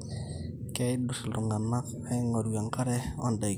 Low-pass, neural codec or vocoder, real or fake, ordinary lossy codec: none; none; real; none